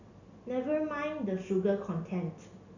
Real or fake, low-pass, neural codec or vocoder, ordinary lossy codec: real; 7.2 kHz; none; none